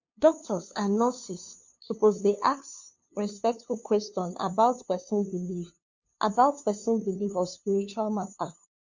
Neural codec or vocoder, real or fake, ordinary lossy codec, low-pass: codec, 16 kHz, 2 kbps, FunCodec, trained on LibriTTS, 25 frames a second; fake; MP3, 48 kbps; 7.2 kHz